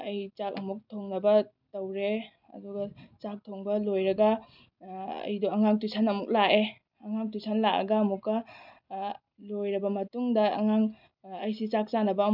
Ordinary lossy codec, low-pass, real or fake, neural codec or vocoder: none; 5.4 kHz; real; none